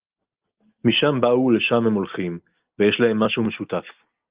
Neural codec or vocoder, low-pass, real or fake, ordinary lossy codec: none; 3.6 kHz; real; Opus, 32 kbps